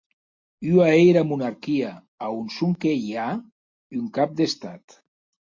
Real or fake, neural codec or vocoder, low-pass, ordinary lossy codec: real; none; 7.2 kHz; MP3, 48 kbps